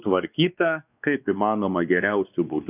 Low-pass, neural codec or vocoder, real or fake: 3.6 kHz; codec, 16 kHz, 2 kbps, X-Codec, WavLM features, trained on Multilingual LibriSpeech; fake